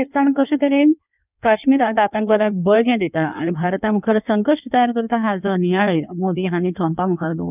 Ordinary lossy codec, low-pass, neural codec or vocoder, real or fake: none; 3.6 kHz; codec, 16 kHz in and 24 kHz out, 1.1 kbps, FireRedTTS-2 codec; fake